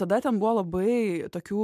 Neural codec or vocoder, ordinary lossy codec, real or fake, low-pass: none; MP3, 96 kbps; real; 14.4 kHz